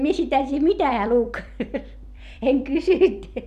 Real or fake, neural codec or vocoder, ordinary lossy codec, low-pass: fake; vocoder, 44.1 kHz, 128 mel bands every 256 samples, BigVGAN v2; none; 14.4 kHz